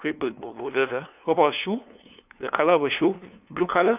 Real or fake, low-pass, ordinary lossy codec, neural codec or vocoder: fake; 3.6 kHz; none; codec, 16 kHz, 2 kbps, FunCodec, trained on LibriTTS, 25 frames a second